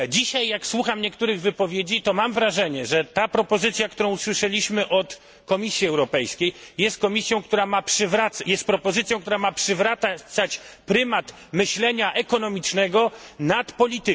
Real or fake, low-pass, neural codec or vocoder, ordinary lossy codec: real; none; none; none